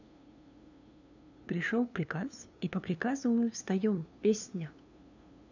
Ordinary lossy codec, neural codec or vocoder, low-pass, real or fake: none; codec, 16 kHz, 2 kbps, FunCodec, trained on LibriTTS, 25 frames a second; 7.2 kHz; fake